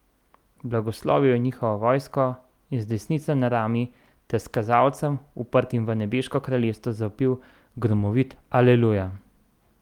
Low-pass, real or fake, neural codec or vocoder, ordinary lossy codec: 19.8 kHz; real; none; Opus, 32 kbps